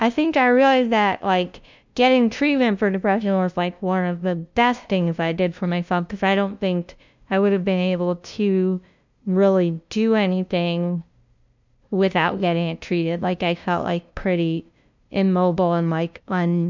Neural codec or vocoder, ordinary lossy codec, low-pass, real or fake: codec, 16 kHz, 0.5 kbps, FunCodec, trained on LibriTTS, 25 frames a second; MP3, 64 kbps; 7.2 kHz; fake